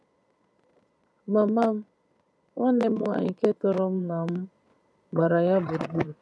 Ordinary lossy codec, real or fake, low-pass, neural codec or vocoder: none; fake; none; vocoder, 22.05 kHz, 80 mel bands, Vocos